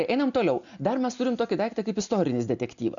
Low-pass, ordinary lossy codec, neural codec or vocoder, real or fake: 7.2 kHz; MP3, 96 kbps; none; real